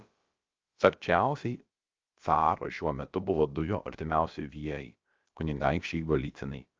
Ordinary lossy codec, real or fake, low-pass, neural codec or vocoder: Opus, 24 kbps; fake; 7.2 kHz; codec, 16 kHz, about 1 kbps, DyCAST, with the encoder's durations